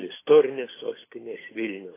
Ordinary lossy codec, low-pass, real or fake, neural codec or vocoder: AAC, 16 kbps; 3.6 kHz; real; none